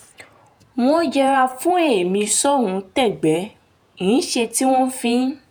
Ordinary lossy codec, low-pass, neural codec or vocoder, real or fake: none; none; vocoder, 48 kHz, 128 mel bands, Vocos; fake